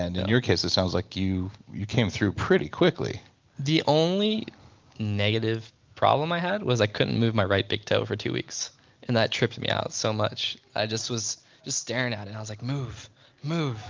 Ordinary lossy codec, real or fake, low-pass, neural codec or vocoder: Opus, 32 kbps; real; 7.2 kHz; none